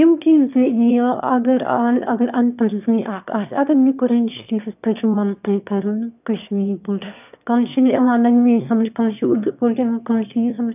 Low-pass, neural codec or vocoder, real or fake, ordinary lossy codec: 3.6 kHz; autoencoder, 22.05 kHz, a latent of 192 numbers a frame, VITS, trained on one speaker; fake; none